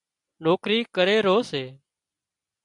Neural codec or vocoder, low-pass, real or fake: none; 10.8 kHz; real